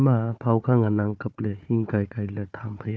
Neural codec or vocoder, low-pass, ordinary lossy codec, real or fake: codec, 16 kHz, 4 kbps, FunCodec, trained on Chinese and English, 50 frames a second; none; none; fake